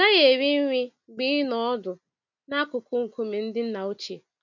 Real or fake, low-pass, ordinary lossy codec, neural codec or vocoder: real; 7.2 kHz; none; none